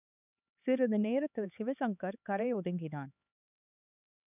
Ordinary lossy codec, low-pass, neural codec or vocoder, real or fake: none; 3.6 kHz; codec, 16 kHz, 4 kbps, X-Codec, HuBERT features, trained on LibriSpeech; fake